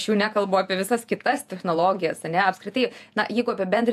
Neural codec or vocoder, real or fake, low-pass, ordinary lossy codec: vocoder, 48 kHz, 128 mel bands, Vocos; fake; 14.4 kHz; AAC, 96 kbps